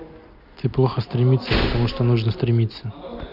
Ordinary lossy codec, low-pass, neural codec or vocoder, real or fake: none; 5.4 kHz; none; real